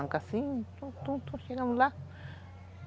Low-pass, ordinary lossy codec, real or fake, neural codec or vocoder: none; none; real; none